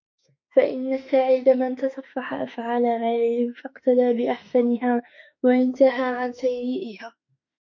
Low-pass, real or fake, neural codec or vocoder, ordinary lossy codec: 7.2 kHz; fake; autoencoder, 48 kHz, 32 numbers a frame, DAC-VAE, trained on Japanese speech; MP3, 48 kbps